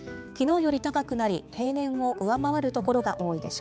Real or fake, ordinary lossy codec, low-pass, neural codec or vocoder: fake; none; none; codec, 16 kHz, 4 kbps, X-Codec, HuBERT features, trained on balanced general audio